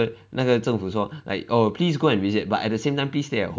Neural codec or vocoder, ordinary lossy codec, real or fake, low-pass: none; none; real; none